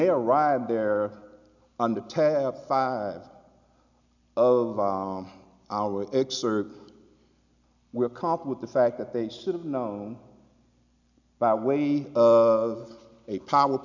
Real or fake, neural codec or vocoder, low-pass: real; none; 7.2 kHz